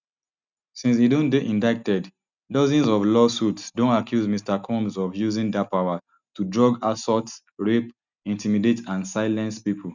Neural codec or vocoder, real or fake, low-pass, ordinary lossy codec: none; real; 7.2 kHz; none